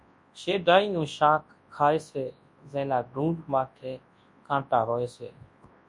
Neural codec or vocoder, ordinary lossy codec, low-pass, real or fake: codec, 24 kHz, 0.9 kbps, WavTokenizer, large speech release; MP3, 48 kbps; 10.8 kHz; fake